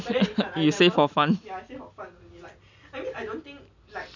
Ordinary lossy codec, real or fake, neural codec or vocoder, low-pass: none; real; none; 7.2 kHz